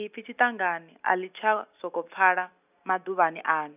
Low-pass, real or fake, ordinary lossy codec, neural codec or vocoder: 3.6 kHz; real; none; none